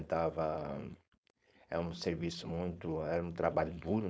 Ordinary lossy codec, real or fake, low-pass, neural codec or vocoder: none; fake; none; codec, 16 kHz, 4.8 kbps, FACodec